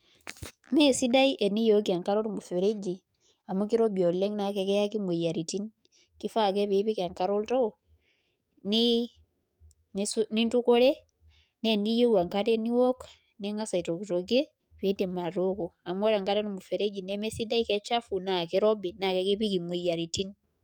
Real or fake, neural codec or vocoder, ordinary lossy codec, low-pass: fake; codec, 44.1 kHz, 7.8 kbps, DAC; none; 19.8 kHz